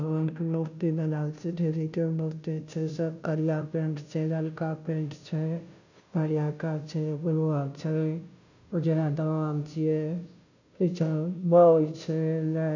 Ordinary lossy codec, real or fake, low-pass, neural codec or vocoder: none; fake; 7.2 kHz; codec, 16 kHz, 0.5 kbps, FunCodec, trained on Chinese and English, 25 frames a second